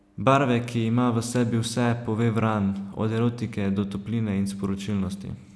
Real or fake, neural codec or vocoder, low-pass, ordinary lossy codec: real; none; none; none